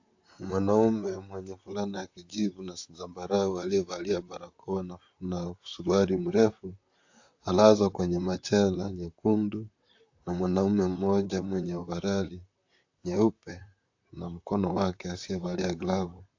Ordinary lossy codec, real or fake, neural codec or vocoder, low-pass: AAC, 48 kbps; fake; vocoder, 44.1 kHz, 128 mel bands, Pupu-Vocoder; 7.2 kHz